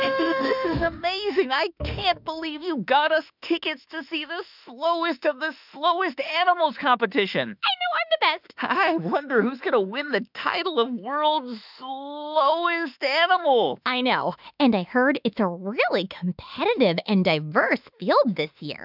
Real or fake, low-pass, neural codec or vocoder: fake; 5.4 kHz; autoencoder, 48 kHz, 32 numbers a frame, DAC-VAE, trained on Japanese speech